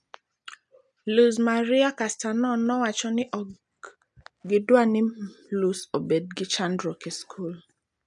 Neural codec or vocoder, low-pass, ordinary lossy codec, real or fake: none; 10.8 kHz; none; real